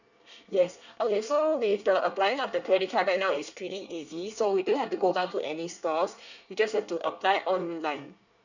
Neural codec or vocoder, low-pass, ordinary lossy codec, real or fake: codec, 24 kHz, 1 kbps, SNAC; 7.2 kHz; none; fake